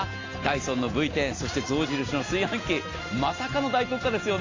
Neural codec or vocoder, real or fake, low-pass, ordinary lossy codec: none; real; 7.2 kHz; AAC, 32 kbps